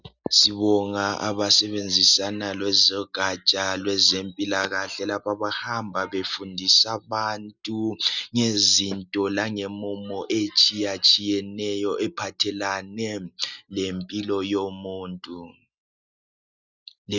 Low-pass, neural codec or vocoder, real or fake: 7.2 kHz; none; real